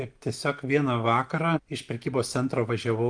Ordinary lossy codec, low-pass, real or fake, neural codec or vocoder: Opus, 32 kbps; 9.9 kHz; real; none